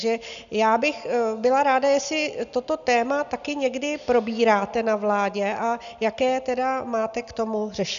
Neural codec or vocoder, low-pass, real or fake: none; 7.2 kHz; real